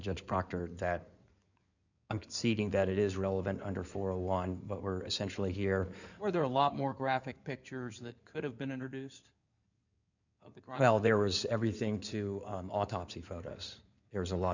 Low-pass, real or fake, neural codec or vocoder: 7.2 kHz; fake; codec, 16 kHz in and 24 kHz out, 2.2 kbps, FireRedTTS-2 codec